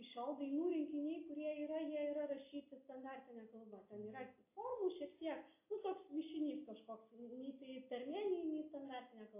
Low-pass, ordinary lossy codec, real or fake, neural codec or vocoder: 3.6 kHz; AAC, 32 kbps; real; none